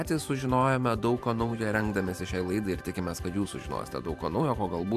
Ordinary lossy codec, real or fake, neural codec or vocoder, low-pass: AAC, 96 kbps; fake; vocoder, 44.1 kHz, 128 mel bands every 512 samples, BigVGAN v2; 14.4 kHz